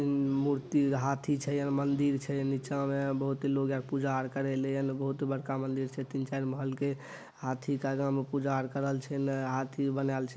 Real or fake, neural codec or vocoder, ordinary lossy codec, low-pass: real; none; none; none